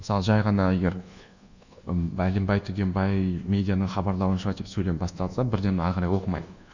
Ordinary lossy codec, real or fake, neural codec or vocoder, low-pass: none; fake; codec, 24 kHz, 1.2 kbps, DualCodec; 7.2 kHz